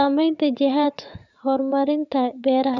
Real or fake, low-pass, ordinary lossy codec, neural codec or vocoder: fake; 7.2 kHz; none; vocoder, 44.1 kHz, 80 mel bands, Vocos